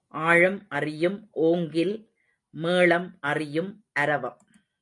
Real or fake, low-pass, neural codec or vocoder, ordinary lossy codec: real; 10.8 kHz; none; AAC, 64 kbps